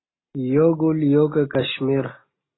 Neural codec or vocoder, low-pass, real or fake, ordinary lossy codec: none; 7.2 kHz; real; AAC, 16 kbps